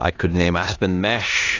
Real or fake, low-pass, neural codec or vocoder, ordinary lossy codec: fake; 7.2 kHz; codec, 16 kHz in and 24 kHz out, 0.9 kbps, LongCat-Audio-Codec, four codebook decoder; AAC, 32 kbps